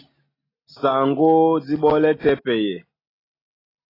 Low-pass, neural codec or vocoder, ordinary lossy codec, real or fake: 5.4 kHz; none; AAC, 24 kbps; real